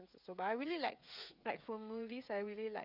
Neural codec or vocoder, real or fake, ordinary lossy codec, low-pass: codec, 16 kHz, 16 kbps, FreqCodec, smaller model; fake; none; 5.4 kHz